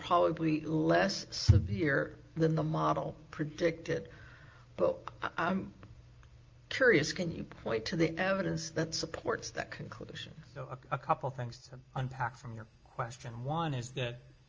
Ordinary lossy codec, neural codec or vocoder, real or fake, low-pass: Opus, 24 kbps; none; real; 7.2 kHz